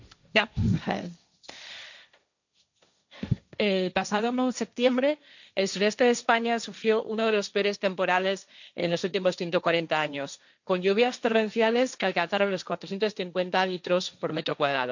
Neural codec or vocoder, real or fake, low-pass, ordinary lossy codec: codec, 16 kHz, 1.1 kbps, Voila-Tokenizer; fake; 7.2 kHz; none